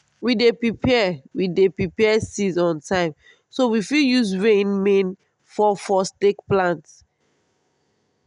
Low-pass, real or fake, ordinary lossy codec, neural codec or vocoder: 10.8 kHz; real; none; none